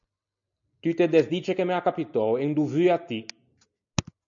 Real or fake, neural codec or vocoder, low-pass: real; none; 7.2 kHz